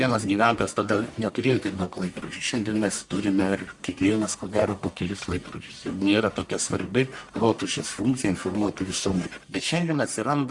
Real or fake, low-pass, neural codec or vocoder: fake; 10.8 kHz; codec, 44.1 kHz, 1.7 kbps, Pupu-Codec